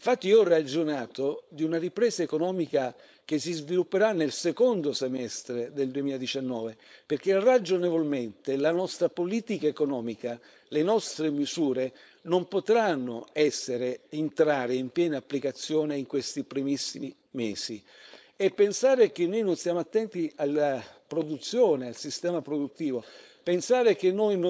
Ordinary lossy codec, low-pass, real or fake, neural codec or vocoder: none; none; fake; codec, 16 kHz, 4.8 kbps, FACodec